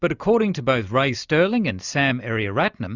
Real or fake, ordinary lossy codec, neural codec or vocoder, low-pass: real; Opus, 64 kbps; none; 7.2 kHz